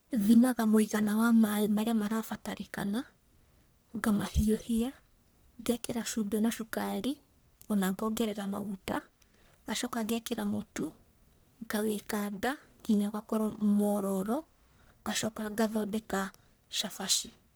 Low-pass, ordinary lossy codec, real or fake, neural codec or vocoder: none; none; fake; codec, 44.1 kHz, 1.7 kbps, Pupu-Codec